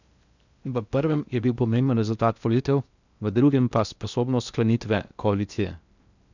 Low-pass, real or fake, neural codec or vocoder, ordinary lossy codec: 7.2 kHz; fake; codec, 16 kHz in and 24 kHz out, 0.6 kbps, FocalCodec, streaming, 2048 codes; none